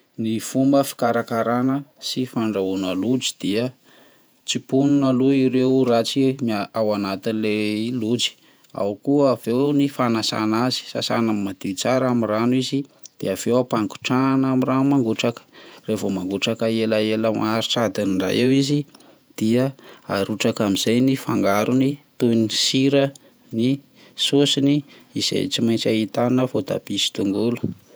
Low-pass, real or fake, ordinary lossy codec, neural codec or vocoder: none; fake; none; vocoder, 48 kHz, 128 mel bands, Vocos